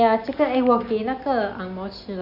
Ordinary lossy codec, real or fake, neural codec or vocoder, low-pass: none; real; none; 5.4 kHz